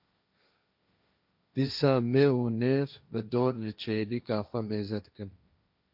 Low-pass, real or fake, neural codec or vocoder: 5.4 kHz; fake; codec, 16 kHz, 1.1 kbps, Voila-Tokenizer